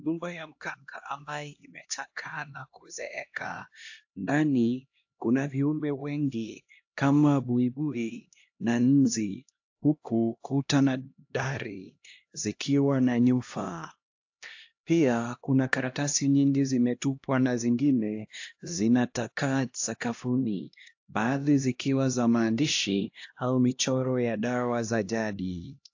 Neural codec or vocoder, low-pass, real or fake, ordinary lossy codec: codec, 16 kHz, 1 kbps, X-Codec, HuBERT features, trained on LibriSpeech; 7.2 kHz; fake; AAC, 48 kbps